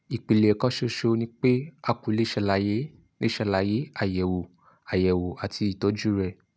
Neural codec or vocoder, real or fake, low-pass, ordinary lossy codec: none; real; none; none